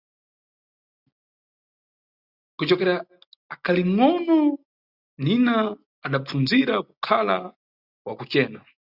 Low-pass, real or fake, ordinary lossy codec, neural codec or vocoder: 5.4 kHz; real; Opus, 64 kbps; none